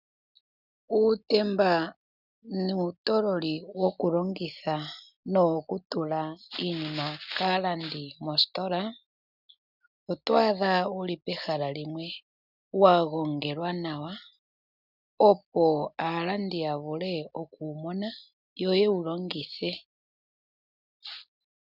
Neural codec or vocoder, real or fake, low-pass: none; real; 5.4 kHz